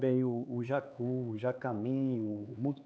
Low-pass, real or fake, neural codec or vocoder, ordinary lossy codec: none; fake; codec, 16 kHz, 4 kbps, X-Codec, HuBERT features, trained on LibriSpeech; none